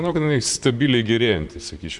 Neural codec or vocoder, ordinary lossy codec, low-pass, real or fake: vocoder, 44.1 kHz, 128 mel bands, Pupu-Vocoder; Opus, 64 kbps; 10.8 kHz; fake